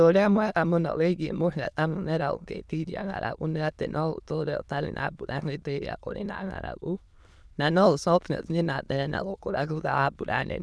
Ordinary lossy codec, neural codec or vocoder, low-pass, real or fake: none; autoencoder, 22.05 kHz, a latent of 192 numbers a frame, VITS, trained on many speakers; none; fake